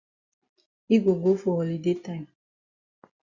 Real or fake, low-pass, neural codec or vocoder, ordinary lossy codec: real; 7.2 kHz; none; Opus, 64 kbps